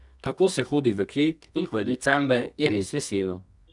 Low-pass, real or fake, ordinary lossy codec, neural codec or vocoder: 10.8 kHz; fake; none; codec, 24 kHz, 0.9 kbps, WavTokenizer, medium music audio release